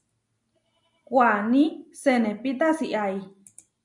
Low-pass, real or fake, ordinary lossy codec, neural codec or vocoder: 10.8 kHz; real; MP3, 64 kbps; none